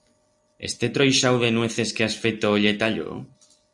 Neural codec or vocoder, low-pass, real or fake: none; 10.8 kHz; real